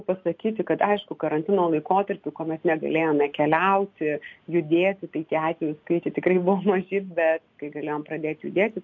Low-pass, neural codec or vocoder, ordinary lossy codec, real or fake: 7.2 kHz; none; MP3, 48 kbps; real